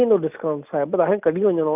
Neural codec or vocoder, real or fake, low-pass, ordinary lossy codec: none; real; 3.6 kHz; none